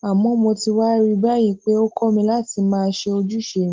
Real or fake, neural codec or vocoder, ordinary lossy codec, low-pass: real; none; Opus, 16 kbps; 7.2 kHz